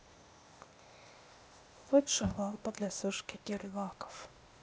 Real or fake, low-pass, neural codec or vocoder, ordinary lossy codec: fake; none; codec, 16 kHz, 0.8 kbps, ZipCodec; none